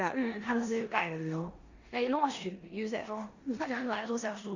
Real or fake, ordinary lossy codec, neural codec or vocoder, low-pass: fake; Opus, 64 kbps; codec, 16 kHz in and 24 kHz out, 0.9 kbps, LongCat-Audio-Codec, four codebook decoder; 7.2 kHz